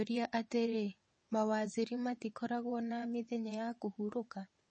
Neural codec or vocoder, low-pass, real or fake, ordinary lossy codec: vocoder, 22.05 kHz, 80 mel bands, WaveNeXt; 9.9 kHz; fake; MP3, 32 kbps